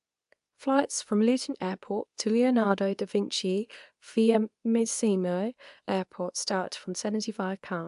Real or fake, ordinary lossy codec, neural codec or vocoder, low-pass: fake; AAC, 96 kbps; codec, 24 kHz, 0.9 kbps, WavTokenizer, medium speech release version 2; 10.8 kHz